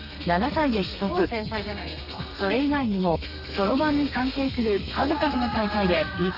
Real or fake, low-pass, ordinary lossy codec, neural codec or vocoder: fake; 5.4 kHz; none; codec, 32 kHz, 1.9 kbps, SNAC